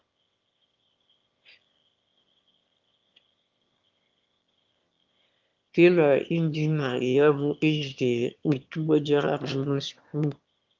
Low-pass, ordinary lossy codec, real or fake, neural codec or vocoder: 7.2 kHz; Opus, 24 kbps; fake; autoencoder, 22.05 kHz, a latent of 192 numbers a frame, VITS, trained on one speaker